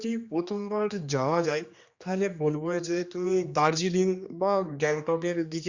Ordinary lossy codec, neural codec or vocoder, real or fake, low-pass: Opus, 64 kbps; codec, 16 kHz, 2 kbps, X-Codec, HuBERT features, trained on general audio; fake; 7.2 kHz